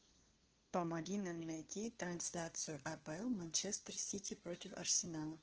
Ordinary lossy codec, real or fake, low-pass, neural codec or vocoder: Opus, 16 kbps; fake; 7.2 kHz; codec, 16 kHz, 2 kbps, FunCodec, trained on LibriTTS, 25 frames a second